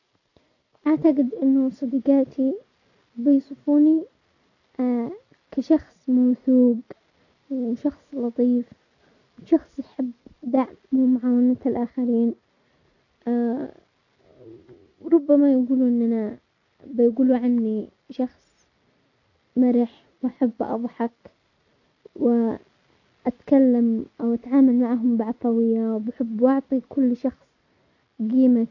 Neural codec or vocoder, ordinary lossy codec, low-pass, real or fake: none; none; 7.2 kHz; real